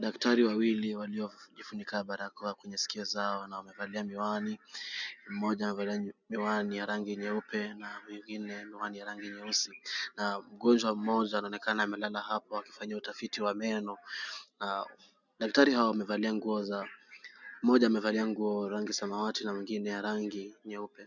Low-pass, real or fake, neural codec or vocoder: 7.2 kHz; real; none